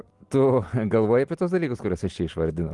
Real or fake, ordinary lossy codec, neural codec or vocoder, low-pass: real; Opus, 24 kbps; none; 10.8 kHz